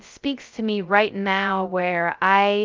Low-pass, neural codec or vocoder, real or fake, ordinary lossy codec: 7.2 kHz; codec, 16 kHz, 0.2 kbps, FocalCodec; fake; Opus, 32 kbps